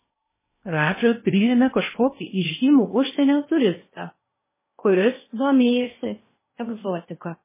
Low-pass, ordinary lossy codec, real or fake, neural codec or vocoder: 3.6 kHz; MP3, 16 kbps; fake; codec, 16 kHz in and 24 kHz out, 0.8 kbps, FocalCodec, streaming, 65536 codes